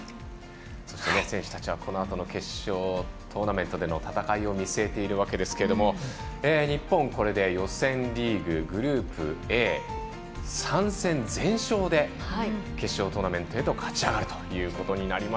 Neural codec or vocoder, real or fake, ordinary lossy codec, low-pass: none; real; none; none